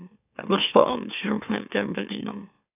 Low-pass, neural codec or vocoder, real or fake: 3.6 kHz; autoencoder, 44.1 kHz, a latent of 192 numbers a frame, MeloTTS; fake